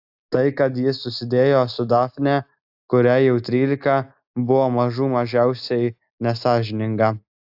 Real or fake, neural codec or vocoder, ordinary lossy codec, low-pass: real; none; AAC, 48 kbps; 5.4 kHz